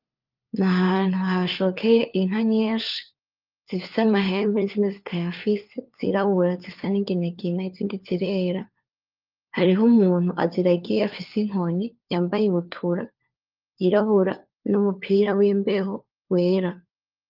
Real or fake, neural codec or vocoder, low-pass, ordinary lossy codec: fake; codec, 16 kHz, 4 kbps, FunCodec, trained on LibriTTS, 50 frames a second; 5.4 kHz; Opus, 32 kbps